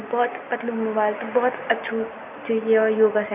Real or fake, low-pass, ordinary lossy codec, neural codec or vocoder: real; 3.6 kHz; none; none